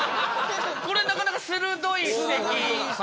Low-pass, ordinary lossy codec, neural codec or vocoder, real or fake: none; none; none; real